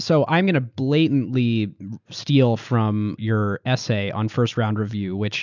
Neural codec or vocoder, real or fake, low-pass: none; real; 7.2 kHz